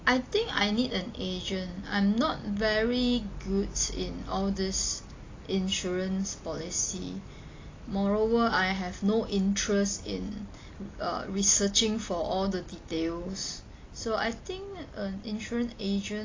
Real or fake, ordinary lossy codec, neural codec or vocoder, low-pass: real; AAC, 32 kbps; none; 7.2 kHz